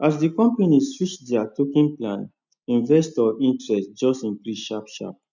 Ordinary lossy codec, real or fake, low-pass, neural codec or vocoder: none; real; 7.2 kHz; none